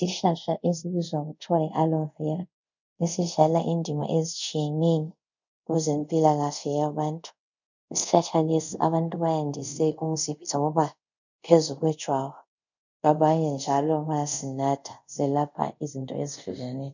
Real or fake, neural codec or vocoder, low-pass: fake; codec, 24 kHz, 0.5 kbps, DualCodec; 7.2 kHz